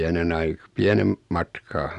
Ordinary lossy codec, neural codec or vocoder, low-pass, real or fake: none; none; 10.8 kHz; real